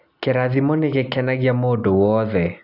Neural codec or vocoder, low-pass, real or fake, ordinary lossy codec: none; 5.4 kHz; real; none